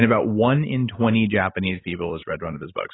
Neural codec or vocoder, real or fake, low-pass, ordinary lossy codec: none; real; 7.2 kHz; AAC, 16 kbps